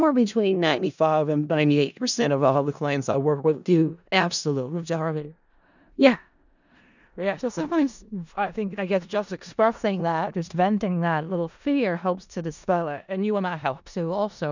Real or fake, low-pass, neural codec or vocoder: fake; 7.2 kHz; codec, 16 kHz in and 24 kHz out, 0.4 kbps, LongCat-Audio-Codec, four codebook decoder